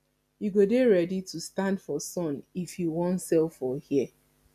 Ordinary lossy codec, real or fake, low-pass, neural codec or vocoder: none; real; 14.4 kHz; none